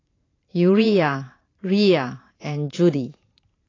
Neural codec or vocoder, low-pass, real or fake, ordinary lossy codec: vocoder, 44.1 kHz, 128 mel bands every 512 samples, BigVGAN v2; 7.2 kHz; fake; AAC, 32 kbps